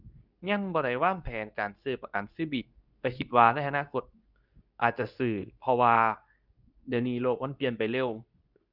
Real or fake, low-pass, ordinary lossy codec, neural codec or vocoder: fake; 5.4 kHz; none; codec, 24 kHz, 0.9 kbps, WavTokenizer, medium speech release version 2